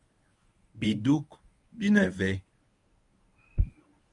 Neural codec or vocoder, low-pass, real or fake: codec, 24 kHz, 0.9 kbps, WavTokenizer, medium speech release version 1; 10.8 kHz; fake